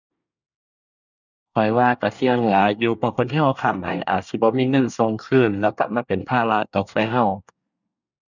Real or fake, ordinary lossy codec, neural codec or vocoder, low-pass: fake; none; codec, 24 kHz, 1 kbps, SNAC; 7.2 kHz